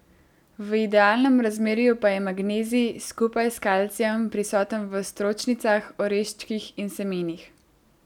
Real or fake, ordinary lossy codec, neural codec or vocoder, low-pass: real; none; none; 19.8 kHz